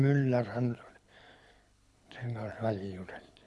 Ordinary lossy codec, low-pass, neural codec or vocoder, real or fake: none; none; codec, 24 kHz, 6 kbps, HILCodec; fake